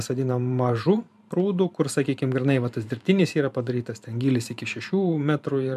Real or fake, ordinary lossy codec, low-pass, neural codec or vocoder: fake; MP3, 96 kbps; 14.4 kHz; vocoder, 44.1 kHz, 128 mel bands every 512 samples, BigVGAN v2